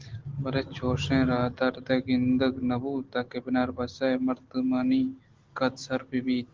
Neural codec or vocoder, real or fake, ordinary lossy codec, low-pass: none; real; Opus, 16 kbps; 7.2 kHz